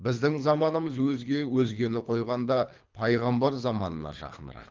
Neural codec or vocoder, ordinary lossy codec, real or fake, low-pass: codec, 24 kHz, 3 kbps, HILCodec; Opus, 24 kbps; fake; 7.2 kHz